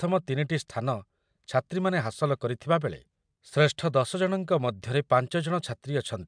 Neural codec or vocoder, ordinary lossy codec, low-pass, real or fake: none; MP3, 96 kbps; 9.9 kHz; real